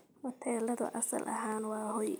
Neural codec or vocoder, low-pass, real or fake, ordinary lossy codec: none; none; real; none